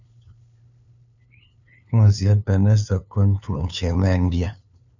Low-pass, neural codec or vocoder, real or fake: 7.2 kHz; codec, 16 kHz, 2 kbps, FunCodec, trained on LibriTTS, 25 frames a second; fake